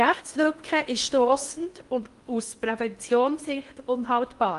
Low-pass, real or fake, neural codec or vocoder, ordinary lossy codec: 10.8 kHz; fake; codec, 16 kHz in and 24 kHz out, 0.8 kbps, FocalCodec, streaming, 65536 codes; Opus, 32 kbps